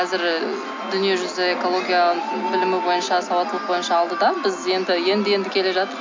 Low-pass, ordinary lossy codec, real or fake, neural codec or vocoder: 7.2 kHz; MP3, 48 kbps; real; none